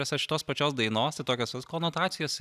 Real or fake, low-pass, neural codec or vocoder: real; 14.4 kHz; none